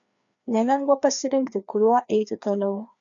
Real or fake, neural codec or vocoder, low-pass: fake; codec, 16 kHz, 2 kbps, FreqCodec, larger model; 7.2 kHz